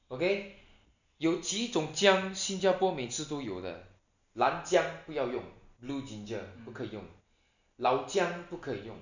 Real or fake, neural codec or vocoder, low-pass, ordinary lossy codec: real; none; 7.2 kHz; none